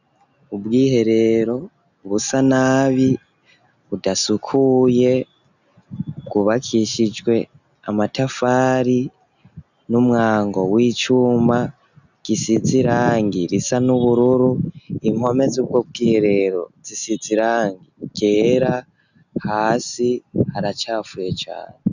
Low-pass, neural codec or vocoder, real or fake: 7.2 kHz; none; real